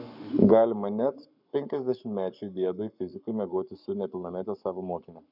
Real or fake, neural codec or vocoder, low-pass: fake; codec, 44.1 kHz, 7.8 kbps, Pupu-Codec; 5.4 kHz